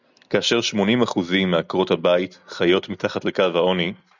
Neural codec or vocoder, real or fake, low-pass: none; real; 7.2 kHz